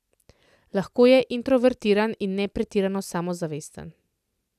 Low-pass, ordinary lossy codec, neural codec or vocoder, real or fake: 14.4 kHz; none; none; real